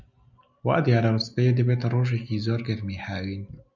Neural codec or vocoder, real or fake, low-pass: none; real; 7.2 kHz